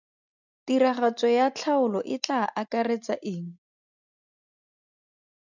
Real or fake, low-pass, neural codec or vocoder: real; 7.2 kHz; none